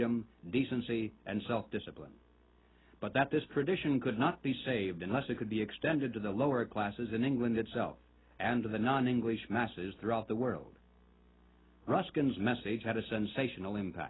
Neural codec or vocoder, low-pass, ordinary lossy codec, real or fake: none; 7.2 kHz; AAC, 16 kbps; real